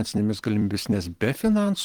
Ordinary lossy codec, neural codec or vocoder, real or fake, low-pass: Opus, 24 kbps; vocoder, 44.1 kHz, 128 mel bands every 256 samples, BigVGAN v2; fake; 19.8 kHz